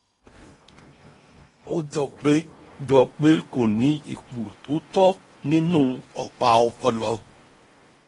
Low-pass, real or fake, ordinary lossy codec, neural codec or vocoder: 10.8 kHz; fake; AAC, 32 kbps; codec, 16 kHz in and 24 kHz out, 0.8 kbps, FocalCodec, streaming, 65536 codes